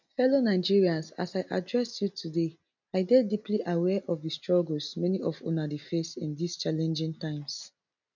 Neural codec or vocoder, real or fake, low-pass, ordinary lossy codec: none; real; 7.2 kHz; none